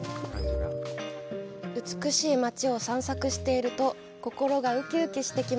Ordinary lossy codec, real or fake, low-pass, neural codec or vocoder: none; real; none; none